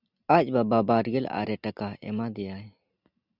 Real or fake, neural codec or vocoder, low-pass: real; none; 5.4 kHz